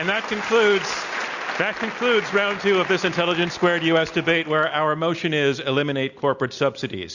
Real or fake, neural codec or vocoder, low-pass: real; none; 7.2 kHz